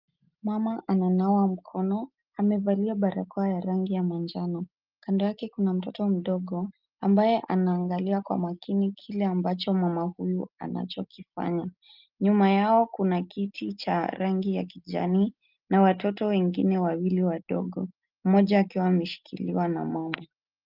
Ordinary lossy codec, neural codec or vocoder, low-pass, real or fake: Opus, 24 kbps; none; 5.4 kHz; real